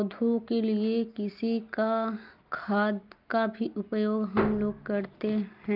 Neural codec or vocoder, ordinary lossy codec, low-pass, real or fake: none; Opus, 24 kbps; 5.4 kHz; real